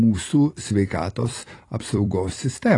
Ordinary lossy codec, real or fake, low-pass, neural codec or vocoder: AAC, 32 kbps; fake; 10.8 kHz; vocoder, 44.1 kHz, 128 mel bands every 256 samples, BigVGAN v2